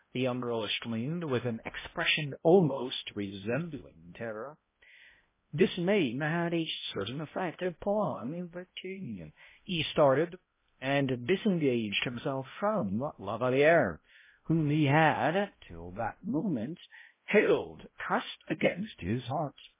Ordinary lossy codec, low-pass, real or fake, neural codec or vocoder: MP3, 16 kbps; 3.6 kHz; fake; codec, 16 kHz, 0.5 kbps, X-Codec, HuBERT features, trained on balanced general audio